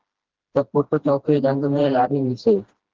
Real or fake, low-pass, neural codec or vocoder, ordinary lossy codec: fake; 7.2 kHz; codec, 16 kHz, 1 kbps, FreqCodec, smaller model; Opus, 16 kbps